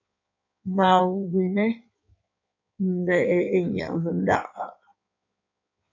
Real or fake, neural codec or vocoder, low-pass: fake; codec, 16 kHz in and 24 kHz out, 1.1 kbps, FireRedTTS-2 codec; 7.2 kHz